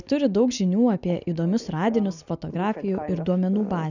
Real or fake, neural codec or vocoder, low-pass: real; none; 7.2 kHz